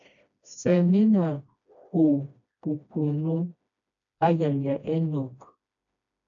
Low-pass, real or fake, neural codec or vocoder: 7.2 kHz; fake; codec, 16 kHz, 1 kbps, FreqCodec, smaller model